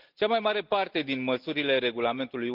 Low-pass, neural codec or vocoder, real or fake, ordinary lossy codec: 5.4 kHz; none; real; Opus, 32 kbps